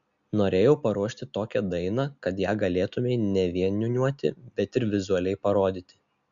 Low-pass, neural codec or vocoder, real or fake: 7.2 kHz; none; real